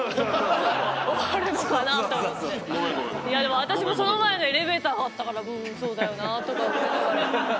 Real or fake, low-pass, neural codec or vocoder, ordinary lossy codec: real; none; none; none